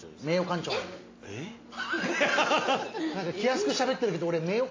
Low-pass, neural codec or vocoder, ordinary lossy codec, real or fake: 7.2 kHz; none; AAC, 32 kbps; real